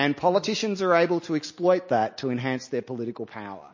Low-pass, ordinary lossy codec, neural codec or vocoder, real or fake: 7.2 kHz; MP3, 32 kbps; none; real